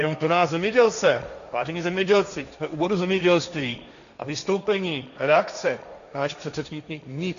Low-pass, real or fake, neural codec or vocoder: 7.2 kHz; fake; codec, 16 kHz, 1.1 kbps, Voila-Tokenizer